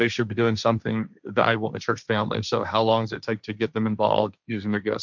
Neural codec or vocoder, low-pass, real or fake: codec, 16 kHz, 1.1 kbps, Voila-Tokenizer; 7.2 kHz; fake